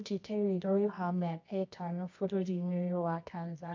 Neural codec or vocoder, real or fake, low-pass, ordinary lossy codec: codec, 24 kHz, 0.9 kbps, WavTokenizer, medium music audio release; fake; 7.2 kHz; MP3, 64 kbps